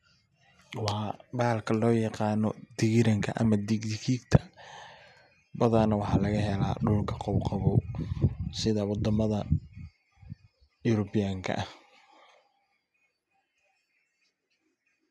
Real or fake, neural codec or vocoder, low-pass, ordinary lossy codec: real; none; none; none